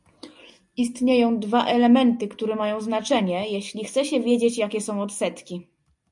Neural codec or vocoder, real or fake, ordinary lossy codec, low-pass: none; real; MP3, 96 kbps; 10.8 kHz